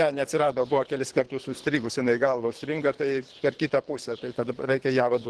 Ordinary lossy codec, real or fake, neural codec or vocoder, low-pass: Opus, 16 kbps; fake; codec, 24 kHz, 3 kbps, HILCodec; 10.8 kHz